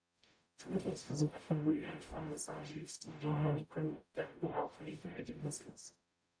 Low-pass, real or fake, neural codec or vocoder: 9.9 kHz; fake; codec, 44.1 kHz, 0.9 kbps, DAC